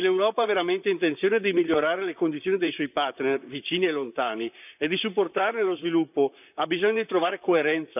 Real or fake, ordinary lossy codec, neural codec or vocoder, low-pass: fake; none; vocoder, 44.1 kHz, 128 mel bands, Pupu-Vocoder; 3.6 kHz